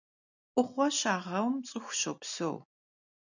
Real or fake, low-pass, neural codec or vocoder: real; 7.2 kHz; none